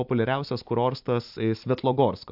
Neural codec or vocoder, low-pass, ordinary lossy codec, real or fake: none; 5.4 kHz; AAC, 48 kbps; real